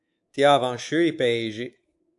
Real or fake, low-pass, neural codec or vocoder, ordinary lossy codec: fake; 10.8 kHz; codec, 24 kHz, 3.1 kbps, DualCodec; AAC, 64 kbps